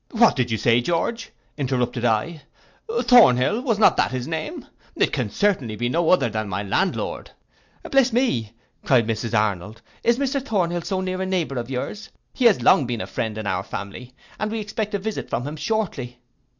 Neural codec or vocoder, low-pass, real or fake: none; 7.2 kHz; real